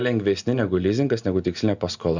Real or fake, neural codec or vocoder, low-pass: real; none; 7.2 kHz